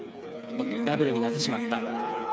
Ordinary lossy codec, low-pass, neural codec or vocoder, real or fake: none; none; codec, 16 kHz, 4 kbps, FreqCodec, smaller model; fake